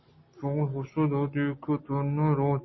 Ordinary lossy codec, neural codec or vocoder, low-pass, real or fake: MP3, 24 kbps; none; 7.2 kHz; real